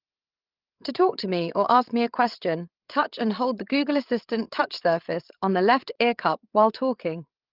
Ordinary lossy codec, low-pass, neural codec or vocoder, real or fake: Opus, 32 kbps; 5.4 kHz; codec, 16 kHz, 8 kbps, FreqCodec, larger model; fake